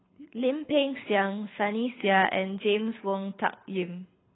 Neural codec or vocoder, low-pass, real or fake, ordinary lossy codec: codec, 24 kHz, 6 kbps, HILCodec; 7.2 kHz; fake; AAC, 16 kbps